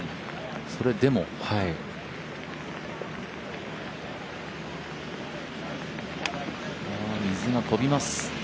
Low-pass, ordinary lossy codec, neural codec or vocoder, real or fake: none; none; none; real